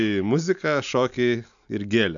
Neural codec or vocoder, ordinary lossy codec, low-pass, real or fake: none; MP3, 96 kbps; 7.2 kHz; real